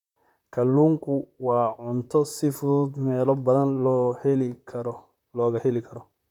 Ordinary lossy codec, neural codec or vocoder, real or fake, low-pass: none; vocoder, 44.1 kHz, 128 mel bands, Pupu-Vocoder; fake; 19.8 kHz